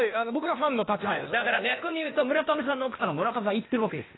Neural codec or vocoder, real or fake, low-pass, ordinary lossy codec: codec, 16 kHz, 0.8 kbps, ZipCodec; fake; 7.2 kHz; AAC, 16 kbps